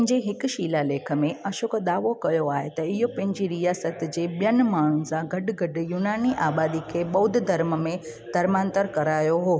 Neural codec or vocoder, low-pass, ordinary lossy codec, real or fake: none; none; none; real